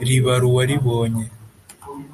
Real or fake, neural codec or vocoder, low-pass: real; none; 14.4 kHz